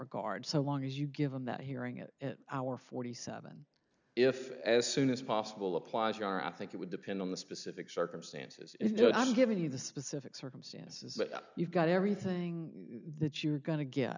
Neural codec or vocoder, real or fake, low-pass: none; real; 7.2 kHz